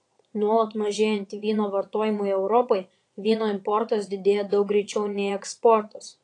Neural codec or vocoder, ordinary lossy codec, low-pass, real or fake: vocoder, 22.05 kHz, 80 mel bands, Vocos; AAC, 48 kbps; 9.9 kHz; fake